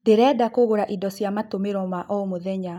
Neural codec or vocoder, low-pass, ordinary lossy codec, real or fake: none; 19.8 kHz; none; real